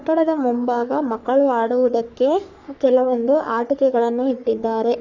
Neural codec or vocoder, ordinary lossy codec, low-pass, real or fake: codec, 44.1 kHz, 3.4 kbps, Pupu-Codec; none; 7.2 kHz; fake